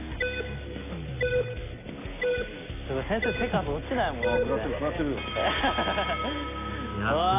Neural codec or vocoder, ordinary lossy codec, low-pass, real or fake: none; AAC, 24 kbps; 3.6 kHz; real